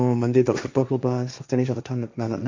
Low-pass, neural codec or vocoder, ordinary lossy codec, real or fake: 7.2 kHz; codec, 16 kHz, 1.1 kbps, Voila-Tokenizer; MP3, 64 kbps; fake